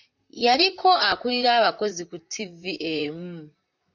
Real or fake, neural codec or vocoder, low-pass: fake; vocoder, 44.1 kHz, 128 mel bands, Pupu-Vocoder; 7.2 kHz